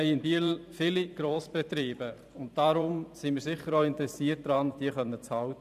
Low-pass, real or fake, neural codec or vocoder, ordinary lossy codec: 14.4 kHz; fake; vocoder, 44.1 kHz, 128 mel bands every 512 samples, BigVGAN v2; none